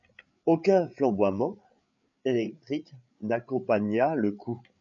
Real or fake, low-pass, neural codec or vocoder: fake; 7.2 kHz; codec, 16 kHz, 8 kbps, FreqCodec, larger model